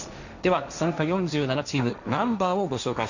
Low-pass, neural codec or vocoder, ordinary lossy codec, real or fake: 7.2 kHz; codec, 16 kHz, 1.1 kbps, Voila-Tokenizer; none; fake